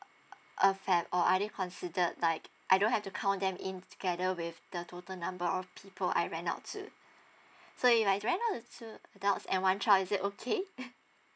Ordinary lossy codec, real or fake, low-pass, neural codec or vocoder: none; real; none; none